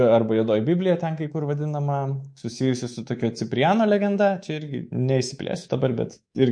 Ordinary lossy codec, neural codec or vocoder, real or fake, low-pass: MP3, 48 kbps; codec, 24 kHz, 3.1 kbps, DualCodec; fake; 9.9 kHz